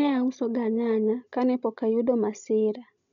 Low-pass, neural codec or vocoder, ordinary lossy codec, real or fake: 7.2 kHz; none; MP3, 64 kbps; real